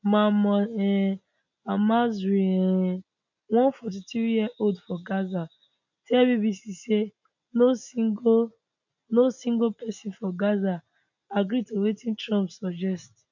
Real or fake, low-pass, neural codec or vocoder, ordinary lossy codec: real; 7.2 kHz; none; none